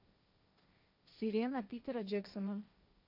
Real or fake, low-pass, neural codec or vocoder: fake; 5.4 kHz; codec, 16 kHz, 1.1 kbps, Voila-Tokenizer